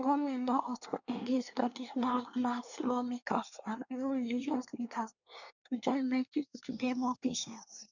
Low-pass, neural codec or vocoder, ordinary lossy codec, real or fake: 7.2 kHz; codec, 24 kHz, 1 kbps, SNAC; none; fake